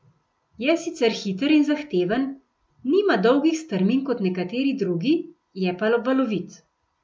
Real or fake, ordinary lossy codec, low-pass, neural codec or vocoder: real; none; none; none